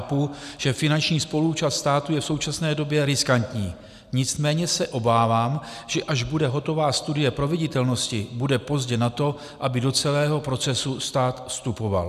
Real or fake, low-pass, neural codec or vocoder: real; 14.4 kHz; none